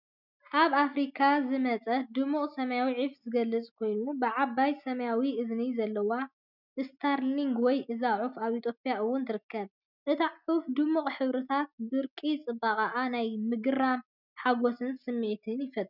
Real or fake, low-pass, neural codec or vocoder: real; 5.4 kHz; none